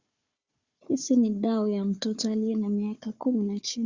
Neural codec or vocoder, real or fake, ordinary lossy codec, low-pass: codec, 16 kHz, 4 kbps, FunCodec, trained on Chinese and English, 50 frames a second; fake; Opus, 64 kbps; 7.2 kHz